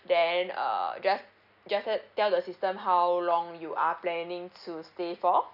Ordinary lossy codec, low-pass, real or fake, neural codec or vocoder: none; 5.4 kHz; real; none